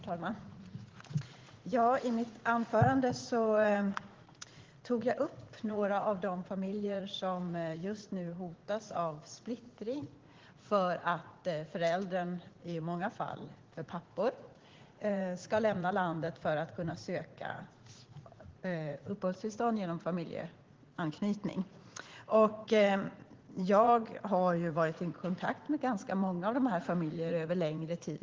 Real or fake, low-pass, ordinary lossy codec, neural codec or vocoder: fake; 7.2 kHz; Opus, 16 kbps; vocoder, 44.1 kHz, 80 mel bands, Vocos